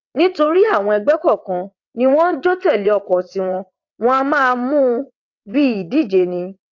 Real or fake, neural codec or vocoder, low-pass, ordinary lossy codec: fake; vocoder, 22.05 kHz, 80 mel bands, WaveNeXt; 7.2 kHz; AAC, 48 kbps